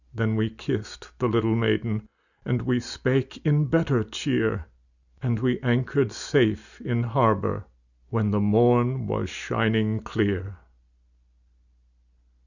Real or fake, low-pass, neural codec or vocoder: real; 7.2 kHz; none